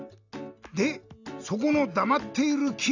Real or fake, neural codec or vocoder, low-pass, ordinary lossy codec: real; none; 7.2 kHz; AAC, 48 kbps